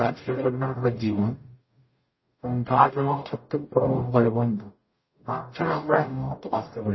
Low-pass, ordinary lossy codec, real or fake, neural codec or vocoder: 7.2 kHz; MP3, 24 kbps; fake; codec, 44.1 kHz, 0.9 kbps, DAC